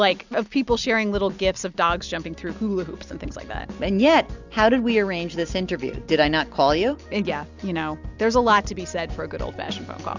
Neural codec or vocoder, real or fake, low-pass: none; real; 7.2 kHz